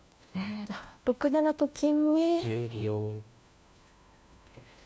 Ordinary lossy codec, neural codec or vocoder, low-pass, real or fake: none; codec, 16 kHz, 1 kbps, FunCodec, trained on LibriTTS, 50 frames a second; none; fake